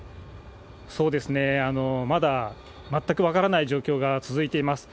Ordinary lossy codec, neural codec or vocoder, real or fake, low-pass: none; none; real; none